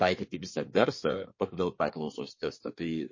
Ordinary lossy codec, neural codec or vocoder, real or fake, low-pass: MP3, 32 kbps; codec, 24 kHz, 1 kbps, SNAC; fake; 7.2 kHz